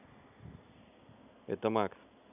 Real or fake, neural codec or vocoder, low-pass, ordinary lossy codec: real; none; 3.6 kHz; none